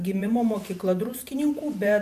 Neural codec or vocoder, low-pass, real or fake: vocoder, 44.1 kHz, 128 mel bands every 512 samples, BigVGAN v2; 14.4 kHz; fake